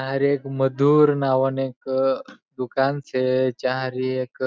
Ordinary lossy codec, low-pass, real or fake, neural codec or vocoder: none; none; real; none